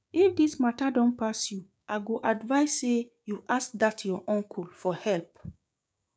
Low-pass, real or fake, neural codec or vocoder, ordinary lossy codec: none; fake; codec, 16 kHz, 6 kbps, DAC; none